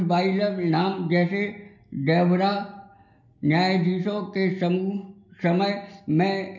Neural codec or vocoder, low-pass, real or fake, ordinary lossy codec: none; 7.2 kHz; real; none